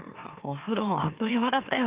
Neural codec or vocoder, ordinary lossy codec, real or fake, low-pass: autoencoder, 44.1 kHz, a latent of 192 numbers a frame, MeloTTS; Opus, 32 kbps; fake; 3.6 kHz